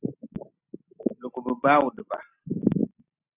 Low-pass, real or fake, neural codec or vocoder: 3.6 kHz; real; none